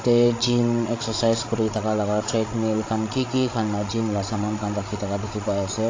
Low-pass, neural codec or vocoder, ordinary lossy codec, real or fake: 7.2 kHz; codec, 16 kHz, 16 kbps, FreqCodec, larger model; AAC, 32 kbps; fake